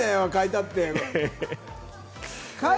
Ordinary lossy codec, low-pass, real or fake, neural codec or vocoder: none; none; real; none